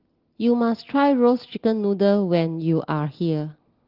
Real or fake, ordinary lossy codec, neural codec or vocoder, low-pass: real; Opus, 16 kbps; none; 5.4 kHz